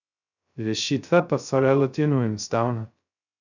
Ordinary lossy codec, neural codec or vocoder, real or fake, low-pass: none; codec, 16 kHz, 0.2 kbps, FocalCodec; fake; 7.2 kHz